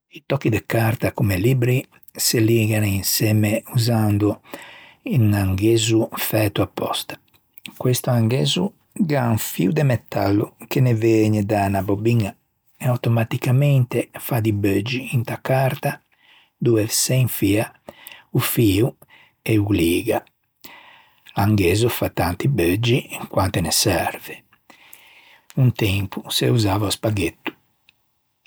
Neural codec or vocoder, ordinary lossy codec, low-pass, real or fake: none; none; none; real